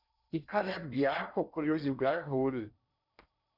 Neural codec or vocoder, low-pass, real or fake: codec, 16 kHz in and 24 kHz out, 0.8 kbps, FocalCodec, streaming, 65536 codes; 5.4 kHz; fake